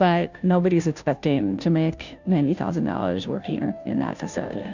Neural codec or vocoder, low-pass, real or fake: codec, 16 kHz, 0.5 kbps, FunCodec, trained on Chinese and English, 25 frames a second; 7.2 kHz; fake